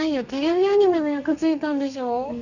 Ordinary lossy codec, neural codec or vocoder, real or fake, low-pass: none; codec, 32 kHz, 1.9 kbps, SNAC; fake; 7.2 kHz